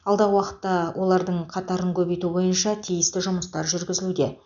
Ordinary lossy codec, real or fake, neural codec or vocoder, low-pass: none; real; none; 7.2 kHz